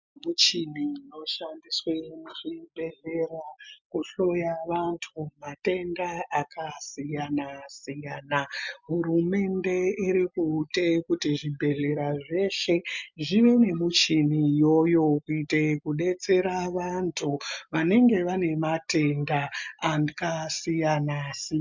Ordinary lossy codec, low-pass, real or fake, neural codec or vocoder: MP3, 64 kbps; 7.2 kHz; real; none